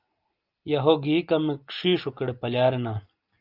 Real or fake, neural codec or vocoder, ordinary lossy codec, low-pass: real; none; Opus, 24 kbps; 5.4 kHz